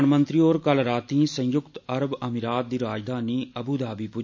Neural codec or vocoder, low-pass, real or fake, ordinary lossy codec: none; 7.2 kHz; real; MP3, 64 kbps